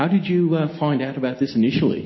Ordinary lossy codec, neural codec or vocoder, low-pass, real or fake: MP3, 24 kbps; none; 7.2 kHz; real